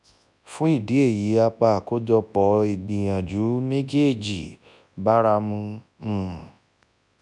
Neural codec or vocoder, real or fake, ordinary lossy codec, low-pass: codec, 24 kHz, 0.9 kbps, WavTokenizer, large speech release; fake; none; 10.8 kHz